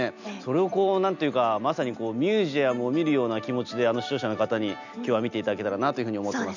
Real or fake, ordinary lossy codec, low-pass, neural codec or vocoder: real; none; 7.2 kHz; none